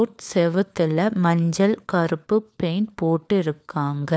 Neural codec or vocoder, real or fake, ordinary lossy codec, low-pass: codec, 16 kHz, 4 kbps, FunCodec, trained on LibriTTS, 50 frames a second; fake; none; none